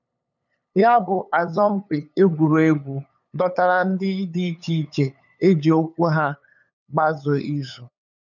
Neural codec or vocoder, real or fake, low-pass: codec, 16 kHz, 8 kbps, FunCodec, trained on LibriTTS, 25 frames a second; fake; 7.2 kHz